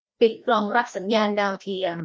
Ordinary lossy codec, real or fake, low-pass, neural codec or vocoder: none; fake; none; codec, 16 kHz, 1 kbps, FreqCodec, larger model